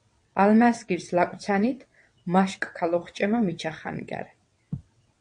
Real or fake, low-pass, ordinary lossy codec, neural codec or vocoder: fake; 9.9 kHz; MP3, 48 kbps; vocoder, 22.05 kHz, 80 mel bands, WaveNeXt